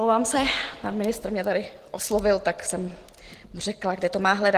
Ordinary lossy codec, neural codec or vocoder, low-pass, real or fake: Opus, 16 kbps; none; 14.4 kHz; real